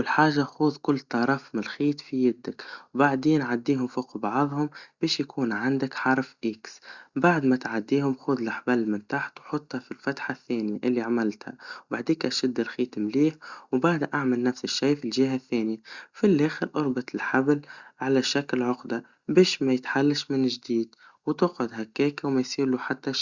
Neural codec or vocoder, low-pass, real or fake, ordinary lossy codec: none; 7.2 kHz; real; Opus, 64 kbps